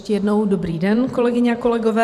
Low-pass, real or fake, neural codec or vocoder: 14.4 kHz; fake; vocoder, 44.1 kHz, 128 mel bands every 512 samples, BigVGAN v2